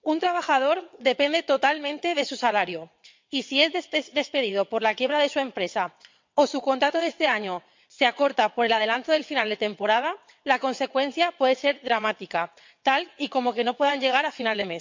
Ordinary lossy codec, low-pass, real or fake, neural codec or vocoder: MP3, 64 kbps; 7.2 kHz; fake; vocoder, 22.05 kHz, 80 mel bands, WaveNeXt